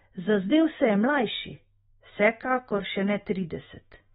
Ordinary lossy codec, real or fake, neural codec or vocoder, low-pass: AAC, 16 kbps; fake; autoencoder, 48 kHz, 128 numbers a frame, DAC-VAE, trained on Japanese speech; 19.8 kHz